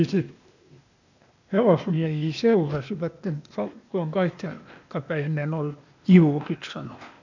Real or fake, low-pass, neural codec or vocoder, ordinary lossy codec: fake; 7.2 kHz; codec, 16 kHz, 0.8 kbps, ZipCodec; none